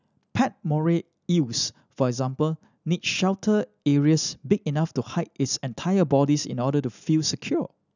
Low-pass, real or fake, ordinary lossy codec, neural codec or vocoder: 7.2 kHz; real; none; none